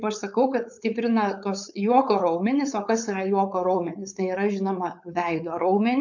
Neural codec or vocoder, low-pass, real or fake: codec, 16 kHz, 4.8 kbps, FACodec; 7.2 kHz; fake